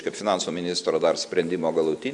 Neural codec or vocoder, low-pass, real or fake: none; 10.8 kHz; real